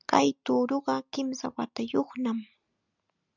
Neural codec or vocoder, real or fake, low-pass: none; real; 7.2 kHz